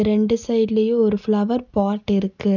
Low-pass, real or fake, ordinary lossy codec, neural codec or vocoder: 7.2 kHz; real; none; none